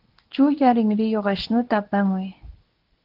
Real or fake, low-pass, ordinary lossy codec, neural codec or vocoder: fake; 5.4 kHz; Opus, 16 kbps; codec, 24 kHz, 0.9 kbps, WavTokenizer, medium speech release version 2